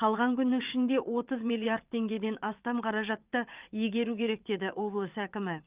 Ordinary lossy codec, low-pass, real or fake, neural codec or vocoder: Opus, 24 kbps; 3.6 kHz; fake; vocoder, 22.05 kHz, 80 mel bands, WaveNeXt